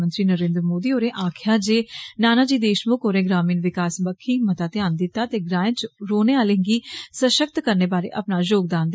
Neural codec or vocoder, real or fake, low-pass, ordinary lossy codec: none; real; none; none